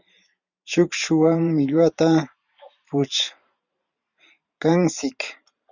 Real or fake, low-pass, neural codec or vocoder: fake; 7.2 kHz; vocoder, 44.1 kHz, 128 mel bands every 512 samples, BigVGAN v2